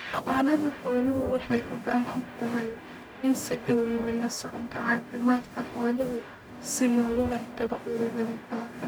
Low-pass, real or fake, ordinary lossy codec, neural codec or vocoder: none; fake; none; codec, 44.1 kHz, 0.9 kbps, DAC